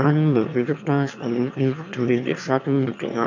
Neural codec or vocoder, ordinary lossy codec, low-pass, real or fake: autoencoder, 22.05 kHz, a latent of 192 numbers a frame, VITS, trained on one speaker; none; 7.2 kHz; fake